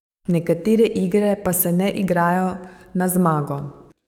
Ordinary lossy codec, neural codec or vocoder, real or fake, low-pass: none; codec, 44.1 kHz, 7.8 kbps, DAC; fake; 19.8 kHz